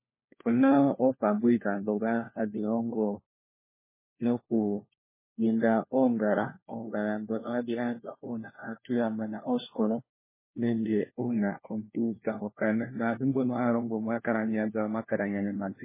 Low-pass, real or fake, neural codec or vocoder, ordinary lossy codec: 3.6 kHz; fake; codec, 16 kHz, 1 kbps, FunCodec, trained on LibriTTS, 50 frames a second; MP3, 16 kbps